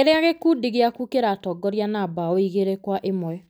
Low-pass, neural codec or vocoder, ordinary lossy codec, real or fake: none; none; none; real